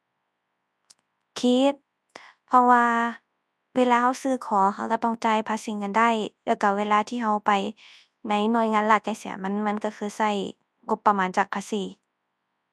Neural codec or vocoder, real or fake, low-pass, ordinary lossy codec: codec, 24 kHz, 0.9 kbps, WavTokenizer, large speech release; fake; none; none